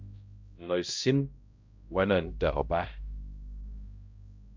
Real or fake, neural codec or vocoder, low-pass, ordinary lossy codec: fake; codec, 16 kHz, 0.5 kbps, X-Codec, HuBERT features, trained on balanced general audio; 7.2 kHz; MP3, 64 kbps